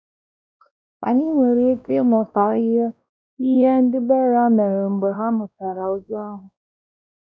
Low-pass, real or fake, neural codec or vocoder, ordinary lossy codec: none; fake; codec, 16 kHz, 1 kbps, X-Codec, WavLM features, trained on Multilingual LibriSpeech; none